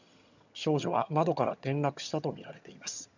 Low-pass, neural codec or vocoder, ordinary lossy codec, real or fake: 7.2 kHz; vocoder, 22.05 kHz, 80 mel bands, HiFi-GAN; none; fake